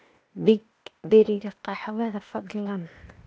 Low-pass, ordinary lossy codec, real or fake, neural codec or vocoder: none; none; fake; codec, 16 kHz, 0.8 kbps, ZipCodec